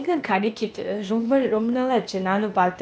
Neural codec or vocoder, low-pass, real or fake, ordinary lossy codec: codec, 16 kHz, 0.8 kbps, ZipCodec; none; fake; none